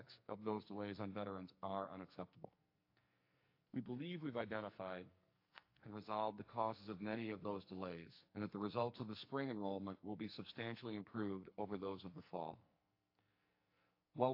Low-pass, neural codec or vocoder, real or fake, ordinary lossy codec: 5.4 kHz; codec, 44.1 kHz, 2.6 kbps, SNAC; fake; AAC, 32 kbps